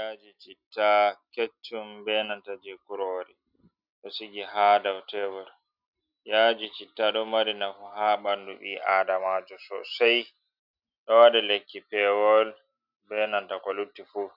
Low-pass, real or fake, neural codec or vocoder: 5.4 kHz; real; none